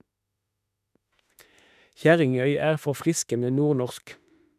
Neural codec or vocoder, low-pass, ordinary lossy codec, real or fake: autoencoder, 48 kHz, 32 numbers a frame, DAC-VAE, trained on Japanese speech; 14.4 kHz; none; fake